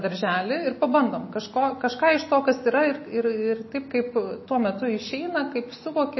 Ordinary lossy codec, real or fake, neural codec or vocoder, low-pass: MP3, 24 kbps; real; none; 7.2 kHz